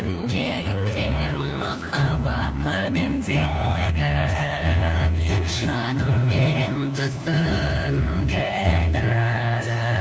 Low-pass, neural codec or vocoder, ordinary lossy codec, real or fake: none; codec, 16 kHz, 1 kbps, FunCodec, trained on LibriTTS, 50 frames a second; none; fake